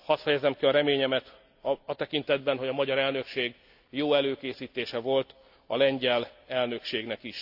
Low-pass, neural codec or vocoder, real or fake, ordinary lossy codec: 5.4 kHz; none; real; AAC, 48 kbps